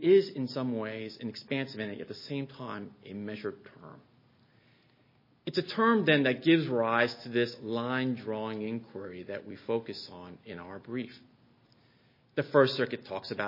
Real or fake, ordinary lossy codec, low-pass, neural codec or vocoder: real; MP3, 24 kbps; 5.4 kHz; none